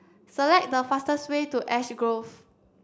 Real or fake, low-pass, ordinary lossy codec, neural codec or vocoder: real; none; none; none